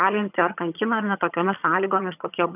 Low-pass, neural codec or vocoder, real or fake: 3.6 kHz; vocoder, 22.05 kHz, 80 mel bands, HiFi-GAN; fake